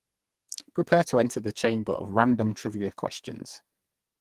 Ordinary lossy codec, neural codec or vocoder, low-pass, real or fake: Opus, 24 kbps; codec, 44.1 kHz, 2.6 kbps, SNAC; 14.4 kHz; fake